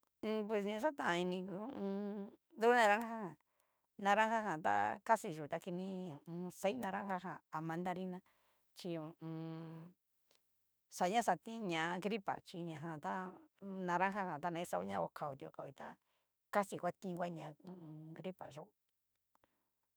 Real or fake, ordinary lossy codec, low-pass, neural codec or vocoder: fake; none; none; autoencoder, 48 kHz, 32 numbers a frame, DAC-VAE, trained on Japanese speech